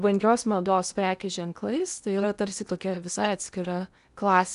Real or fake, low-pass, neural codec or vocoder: fake; 10.8 kHz; codec, 16 kHz in and 24 kHz out, 0.8 kbps, FocalCodec, streaming, 65536 codes